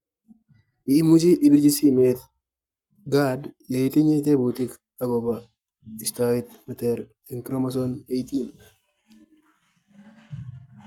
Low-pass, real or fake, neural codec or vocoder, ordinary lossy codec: 19.8 kHz; fake; codec, 44.1 kHz, 7.8 kbps, Pupu-Codec; none